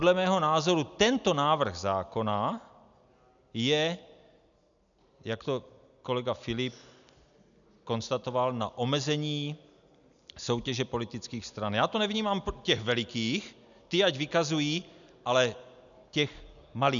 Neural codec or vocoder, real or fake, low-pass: none; real; 7.2 kHz